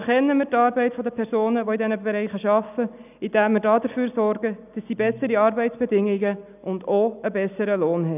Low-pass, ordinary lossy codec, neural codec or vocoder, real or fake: 3.6 kHz; none; none; real